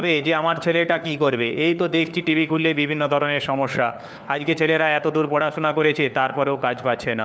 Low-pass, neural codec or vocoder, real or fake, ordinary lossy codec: none; codec, 16 kHz, 4 kbps, FunCodec, trained on LibriTTS, 50 frames a second; fake; none